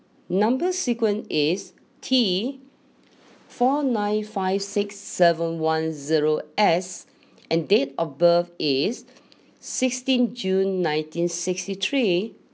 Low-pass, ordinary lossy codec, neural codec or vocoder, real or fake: none; none; none; real